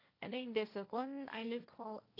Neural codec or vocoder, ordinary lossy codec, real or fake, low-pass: codec, 16 kHz, 1.1 kbps, Voila-Tokenizer; AAC, 32 kbps; fake; 5.4 kHz